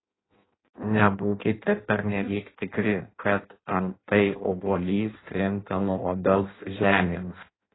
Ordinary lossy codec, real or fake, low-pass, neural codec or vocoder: AAC, 16 kbps; fake; 7.2 kHz; codec, 16 kHz in and 24 kHz out, 0.6 kbps, FireRedTTS-2 codec